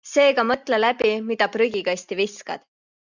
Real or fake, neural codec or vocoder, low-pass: real; none; 7.2 kHz